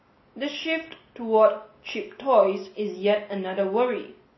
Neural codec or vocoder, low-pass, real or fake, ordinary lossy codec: none; 7.2 kHz; real; MP3, 24 kbps